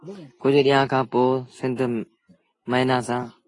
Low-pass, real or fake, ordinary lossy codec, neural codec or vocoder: 10.8 kHz; real; AAC, 32 kbps; none